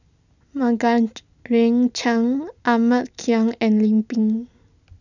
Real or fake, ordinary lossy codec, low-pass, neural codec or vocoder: real; none; 7.2 kHz; none